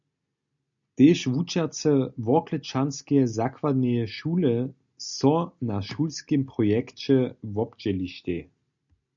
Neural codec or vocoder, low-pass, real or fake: none; 7.2 kHz; real